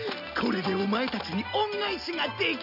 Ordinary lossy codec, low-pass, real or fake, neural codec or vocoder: none; 5.4 kHz; real; none